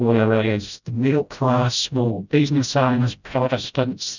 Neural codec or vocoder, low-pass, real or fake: codec, 16 kHz, 0.5 kbps, FreqCodec, smaller model; 7.2 kHz; fake